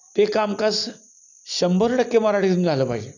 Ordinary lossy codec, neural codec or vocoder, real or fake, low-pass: none; none; real; 7.2 kHz